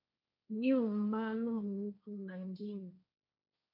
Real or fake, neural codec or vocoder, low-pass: fake; codec, 16 kHz, 1.1 kbps, Voila-Tokenizer; 5.4 kHz